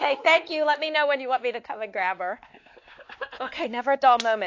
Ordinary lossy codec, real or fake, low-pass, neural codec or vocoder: AAC, 48 kbps; fake; 7.2 kHz; codec, 16 kHz, 2 kbps, X-Codec, WavLM features, trained on Multilingual LibriSpeech